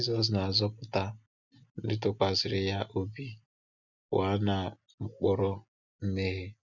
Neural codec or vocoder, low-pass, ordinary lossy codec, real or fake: none; 7.2 kHz; none; real